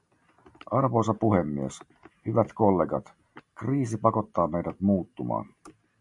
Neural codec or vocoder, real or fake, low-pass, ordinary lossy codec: none; real; 10.8 kHz; MP3, 96 kbps